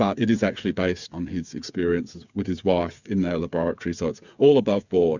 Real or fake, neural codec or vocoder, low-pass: fake; codec, 16 kHz, 8 kbps, FreqCodec, smaller model; 7.2 kHz